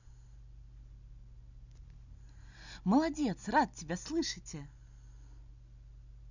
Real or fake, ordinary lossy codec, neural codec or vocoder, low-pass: real; none; none; 7.2 kHz